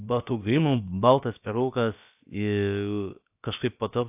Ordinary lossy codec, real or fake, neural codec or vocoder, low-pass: AAC, 32 kbps; fake; codec, 16 kHz, about 1 kbps, DyCAST, with the encoder's durations; 3.6 kHz